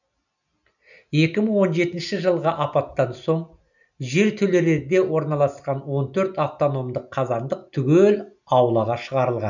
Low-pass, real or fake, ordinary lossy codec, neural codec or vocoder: 7.2 kHz; real; none; none